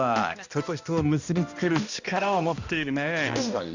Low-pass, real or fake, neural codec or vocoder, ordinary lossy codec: 7.2 kHz; fake; codec, 16 kHz, 1 kbps, X-Codec, HuBERT features, trained on balanced general audio; Opus, 64 kbps